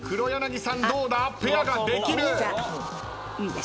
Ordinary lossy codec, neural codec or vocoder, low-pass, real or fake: none; none; none; real